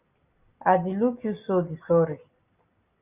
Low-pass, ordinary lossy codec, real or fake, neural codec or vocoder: 3.6 kHz; Opus, 64 kbps; real; none